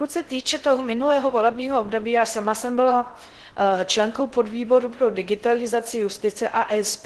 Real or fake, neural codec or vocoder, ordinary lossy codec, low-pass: fake; codec, 16 kHz in and 24 kHz out, 0.6 kbps, FocalCodec, streaming, 4096 codes; Opus, 16 kbps; 10.8 kHz